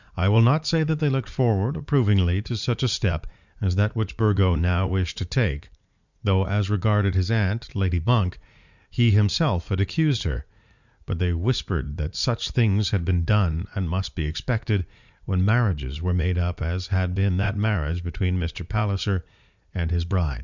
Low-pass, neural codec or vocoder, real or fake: 7.2 kHz; vocoder, 44.1 kHz, 80 mel bands, Vocos; fake